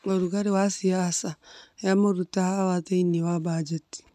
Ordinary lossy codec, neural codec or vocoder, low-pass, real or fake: none; none; 14.4 kHz; real